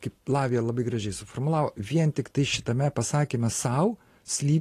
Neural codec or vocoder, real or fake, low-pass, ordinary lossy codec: none; real; 14.4 kHz; AAC, 48 kbps